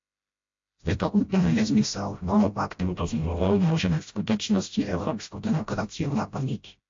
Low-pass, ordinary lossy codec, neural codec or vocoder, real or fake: 7.2 kHz; AAC, 48 kbps; codec, 16 kHz, 0.5 kbps, FreqCodec, smaller model; fake